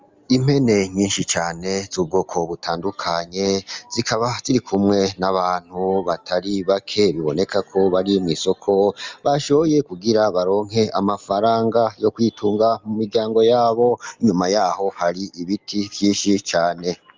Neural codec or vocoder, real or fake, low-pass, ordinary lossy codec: none; real; 7.2 kHz; Opus, 32 kbps